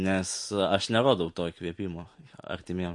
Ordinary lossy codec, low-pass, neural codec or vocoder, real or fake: MP3, 48 kbps; 10.8 kHz; vocoder, 44.1 kHz, 128 mel bands every 256 samples, BigVGAN v2; fake